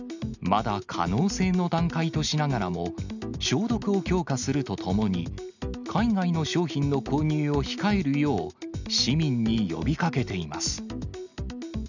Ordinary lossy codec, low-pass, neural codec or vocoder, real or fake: none; 7.2 kHz; none; real